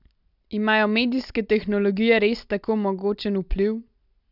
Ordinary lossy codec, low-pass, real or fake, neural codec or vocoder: none; 5.4 kHz; real; none